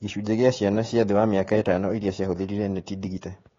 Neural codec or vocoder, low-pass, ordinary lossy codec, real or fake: codec, 16 kHz, 16 kbps, FreqCodec, smaller model; 7.2 kHz; AAC, 32 kbps; fake